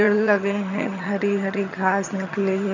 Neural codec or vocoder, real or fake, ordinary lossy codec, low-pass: vocoder, 22.05 kHz, 80 mel bands, HiFi-GAN; fake; none; 7.2 kHz